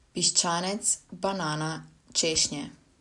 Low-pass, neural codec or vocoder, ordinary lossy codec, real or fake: 10.8 kHz; none; none; real